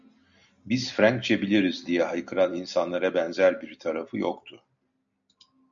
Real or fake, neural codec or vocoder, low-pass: real; none; 7.2 kHz